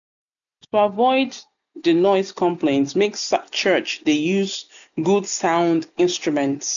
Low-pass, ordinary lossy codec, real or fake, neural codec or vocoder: 7.2 kHz; none; real; none